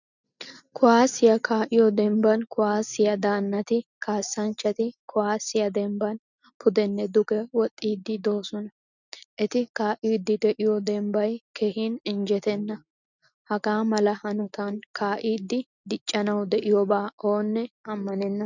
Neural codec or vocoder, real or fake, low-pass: none; real; 7.2 kHz